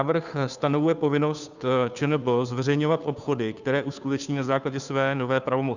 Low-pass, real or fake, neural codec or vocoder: 7.2 kHz; fake; codec, 16 kHz, 2 kbps, FunCodec, trained on Chinese and English, 25 frames a second